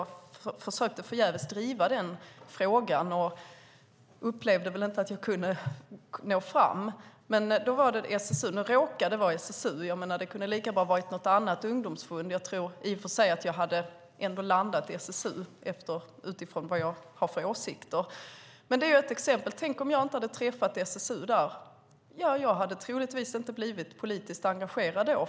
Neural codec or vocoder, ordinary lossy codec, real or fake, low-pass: none; none; real; none